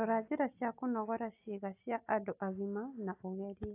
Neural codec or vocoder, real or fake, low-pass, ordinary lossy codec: none; real; 3.6 kHz; AAC, 24 kbps